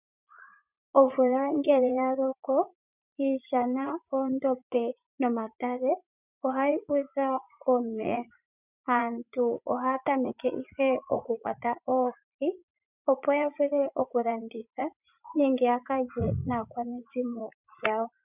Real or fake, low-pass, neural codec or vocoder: fake; 3.6 kHz; vocoder, 44.1 kHz, 80 mel bands, Vocos